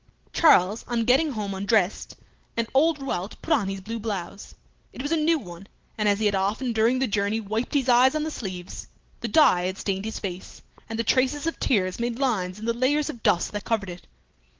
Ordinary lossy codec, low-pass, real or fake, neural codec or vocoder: Opus, 16 kbps; 7.2 kHz; real; none